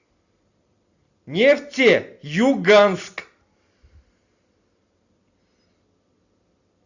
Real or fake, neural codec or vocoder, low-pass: real; none; 7.2 kHz